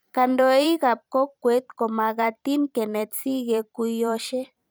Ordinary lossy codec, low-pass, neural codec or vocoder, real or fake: none; none; vocoder, 44.1 kHz, 128 mel bands every 512 samples, BigVGAN v2; fake